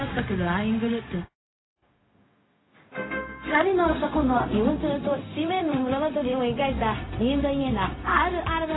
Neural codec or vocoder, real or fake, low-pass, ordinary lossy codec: codec, 16 kHz, 0.4 kbps, LongCat-Audio-Codec; fake; 7.2 kHz; AAC, 16 kbps